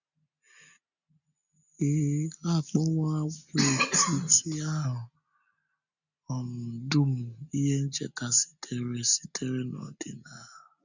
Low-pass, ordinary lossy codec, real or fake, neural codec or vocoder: 7.2 kHz; none; real; none